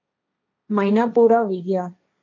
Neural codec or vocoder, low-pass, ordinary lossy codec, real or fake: codec, 16 kHz, 1.1 kbps, Voila-Tokenizer; 7.2 kHz; MP3, 48 kbps; fake